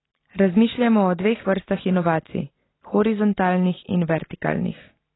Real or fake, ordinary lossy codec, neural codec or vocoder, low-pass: real; AAC, 16 kbps; none; 7.2 kHz